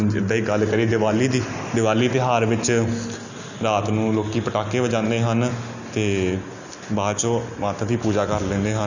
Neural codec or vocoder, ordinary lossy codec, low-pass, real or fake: none; none; 7.2 kHz; real